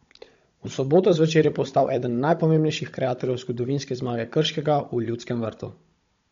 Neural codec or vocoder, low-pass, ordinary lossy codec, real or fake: codec, 16 kHz, 16 kbps, FunCodec, trained on Chinese and English, 50 frames a second; 7.2 kHz; MP3, 48 kbps; fake